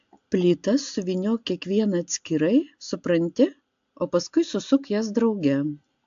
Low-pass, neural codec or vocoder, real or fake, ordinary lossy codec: 7.2 kHz; none; real; AAC, 64 kbps